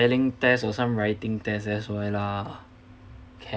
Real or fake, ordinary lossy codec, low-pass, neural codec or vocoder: real; none; none; none